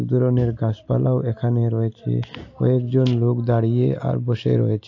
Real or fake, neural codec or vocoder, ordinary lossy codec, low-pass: real; none; AAC, 48 kbps; 7.2 kHz